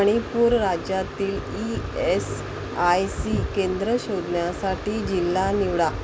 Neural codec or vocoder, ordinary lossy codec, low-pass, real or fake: none; none; none; real